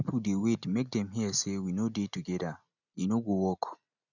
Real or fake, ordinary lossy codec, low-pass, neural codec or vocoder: real; none; 7.2 kHz; none